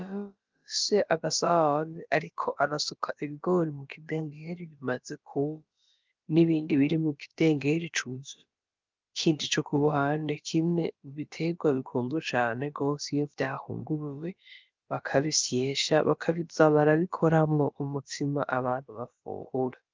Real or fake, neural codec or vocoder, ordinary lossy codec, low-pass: fake; codec, 16 kHz, about 1 kbps, DyCAST, with the encoder's durations; Opus, 24 kbps; 7.2 kHz